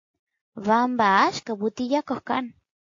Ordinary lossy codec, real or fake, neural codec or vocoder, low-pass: AAC, 48 kbps; real; none; 7.2 kHz